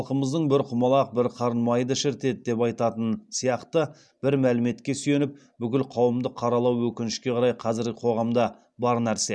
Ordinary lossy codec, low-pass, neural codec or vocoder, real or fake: none; none; none; real